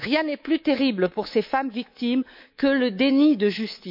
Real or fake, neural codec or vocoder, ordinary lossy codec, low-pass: fake; codec, 24 kHz, 3.1 kbps, DualCodec; none; 5.4 kHz